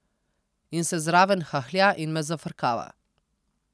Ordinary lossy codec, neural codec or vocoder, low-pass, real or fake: none; none; none; real